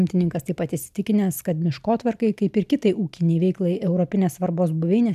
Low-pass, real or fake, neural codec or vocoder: 14.4 kHz; real; none